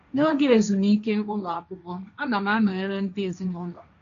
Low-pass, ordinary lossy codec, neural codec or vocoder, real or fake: 7.2 kHz; none; codec, 16 kHz, 1.1 kbps, Voila-Tokenizer; fake